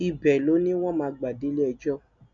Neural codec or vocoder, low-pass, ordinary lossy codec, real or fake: none; 7.2 kHz; none; real